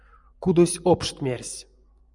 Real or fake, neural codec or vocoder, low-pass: fake; vocoder, 24 kHz, 100 mel bands, Vocos; 10.8 kHz